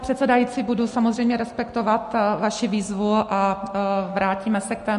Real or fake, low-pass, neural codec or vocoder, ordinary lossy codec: real; 14.4 kHz; none; MP3, 48 kbps